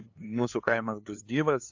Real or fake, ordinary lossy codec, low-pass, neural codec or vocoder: fake; AAC, 48 kbps; 7.2 kHz; codec, 16 kHz, 2 kbps, FunCodec, trained on LibriTTS, 25 frames a second